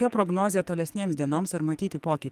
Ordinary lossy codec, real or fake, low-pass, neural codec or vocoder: Opus, 32 kbps; fake; 14.4 kHz; codec, 44.1 kHz, 2.6 kbps, SNAC